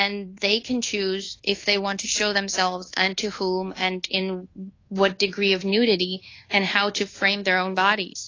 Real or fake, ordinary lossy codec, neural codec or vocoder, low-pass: fake; AAC, 32 kbps; codec, 24 kHz, 1.2 kbps, DualCodec; 7.2 kHz